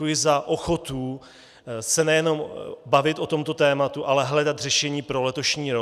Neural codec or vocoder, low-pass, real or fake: none; 14.4 kHz; real